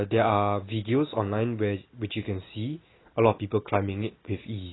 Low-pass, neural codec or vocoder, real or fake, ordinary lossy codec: 7.2 kHz; none; real; AAC, 16 kbps